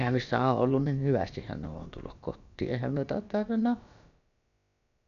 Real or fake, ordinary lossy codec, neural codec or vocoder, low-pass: fake; none; codec, 16 kHz, about 1 kbps, DyCAST, with the encoder's durations; 7.2 kHz